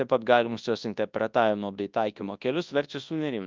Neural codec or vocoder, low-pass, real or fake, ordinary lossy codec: codec, 24 kHz, 0.9 kbps, WavTokenizer, large speech release; 7.2 kHz; fake; Opus, 24 kbps